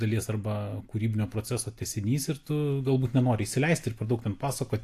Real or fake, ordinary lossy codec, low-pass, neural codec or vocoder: real; AAC, 64 kbps; 14.4 kHz; none